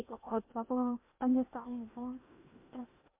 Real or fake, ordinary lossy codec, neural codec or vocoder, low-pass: fake; MP3, 32 kbps; codec, 16 kHz in and 24 kHz out, 0.8 kbps, FocalCodec, streaming, 65536 codes; 3.6 kHz